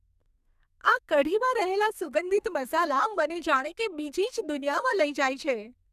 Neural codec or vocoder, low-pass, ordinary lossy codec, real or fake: codec, 32 kHz, 1.9 kbps, SNAC; 14.4 kHz; none; fake